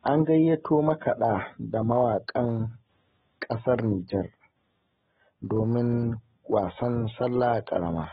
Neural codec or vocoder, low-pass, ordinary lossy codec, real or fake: none; 7.2 kHz; AAC, 16 kbps; real